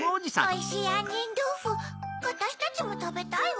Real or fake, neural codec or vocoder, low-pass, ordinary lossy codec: real; none; none; none